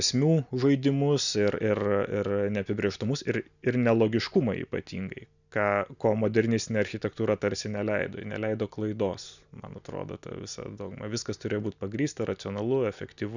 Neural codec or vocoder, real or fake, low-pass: none; real; 7.2 kHz